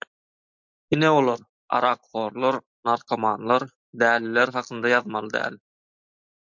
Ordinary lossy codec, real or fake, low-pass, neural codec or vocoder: MP3, 64 kbps; real; 7.2 kHz; none